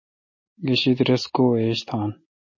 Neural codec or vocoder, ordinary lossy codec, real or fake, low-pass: none; MP3, 32 kbps; real; 7.2 kHz